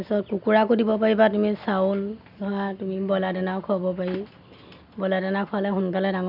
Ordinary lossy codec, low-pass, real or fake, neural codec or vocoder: none; 5.4 kHz; real; none